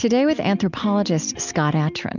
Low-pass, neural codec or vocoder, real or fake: 7.2 kHz; none; real